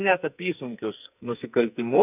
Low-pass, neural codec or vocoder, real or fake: 3.6 kHz; codec, 44.1 kHz, 2.6 kbps, SNAC; fake